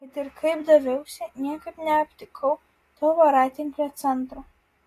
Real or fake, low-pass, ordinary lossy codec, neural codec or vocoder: real; 14.4 kHz; AAC, 48 kbps; none